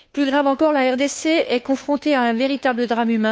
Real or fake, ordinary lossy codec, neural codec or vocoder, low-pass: fake; none; codec, 16 kHz, 2 kbps, FunCodec, trained on Chinese and English, 25 frames a second; none